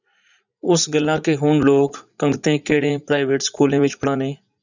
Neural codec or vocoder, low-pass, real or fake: vocoder, 44.1 kHz, 80 mel bands, Vocos; 7.2 kHz; fake